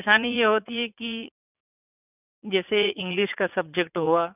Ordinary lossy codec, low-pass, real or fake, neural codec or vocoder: none; 3.6 kHz; fake; vocoder, 44.1 kHz, 80 mel bands, Vocos